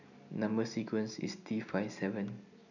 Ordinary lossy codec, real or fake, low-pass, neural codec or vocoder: none; real; 7.2 kHz; none